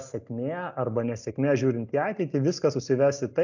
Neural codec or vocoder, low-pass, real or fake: none; 7.2 kHz; real